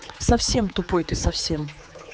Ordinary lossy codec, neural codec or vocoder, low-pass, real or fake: none; codec, 16 kHz, 4 kbps, X-Codec, HuBERT features, trained on general audio; none; fake